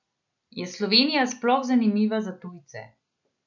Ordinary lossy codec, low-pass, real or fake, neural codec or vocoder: none; 7.2 kHz; real; none